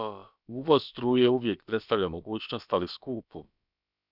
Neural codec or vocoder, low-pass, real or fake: codec, 16 kHz, about 1 kbps, DyCAST, with the encoder's durations; 5.4 kHz; fake